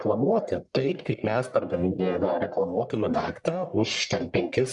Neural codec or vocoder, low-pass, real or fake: codec, 44.1 kHz, 1.7 kbps, Pupu-Codec; 10.8 kHz; fake